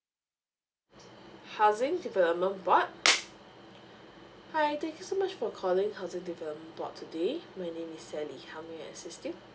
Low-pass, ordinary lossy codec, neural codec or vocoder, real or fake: none; none; none; real